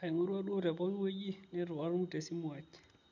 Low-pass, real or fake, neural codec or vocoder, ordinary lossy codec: 7.2 kHz; real; none; none